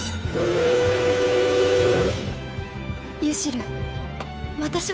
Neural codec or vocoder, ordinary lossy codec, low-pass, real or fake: codec, 16 kHz, 2 kbps, FunCodec, trained on Chinese and English, 25 frames a second; none; none; fake